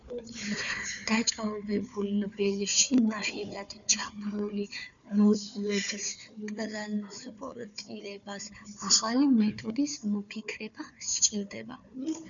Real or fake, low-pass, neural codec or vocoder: fake; 7.2 kHz; codec, 16 kHz, 4 kbps, FunCodec, trained on Chinese and English, 50 frames a second